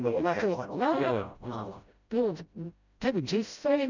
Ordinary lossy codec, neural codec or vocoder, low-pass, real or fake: none; codec, 16 kHz, 0.5 kbps, FreqCodec, smaller model; 7.2 kHz; fake